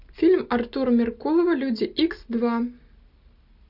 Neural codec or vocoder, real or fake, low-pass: none; real; 5.4 kHz